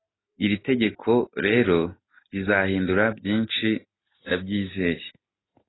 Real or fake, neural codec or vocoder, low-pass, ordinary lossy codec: real; none; 7.2 kHz; AAC, 16 kbps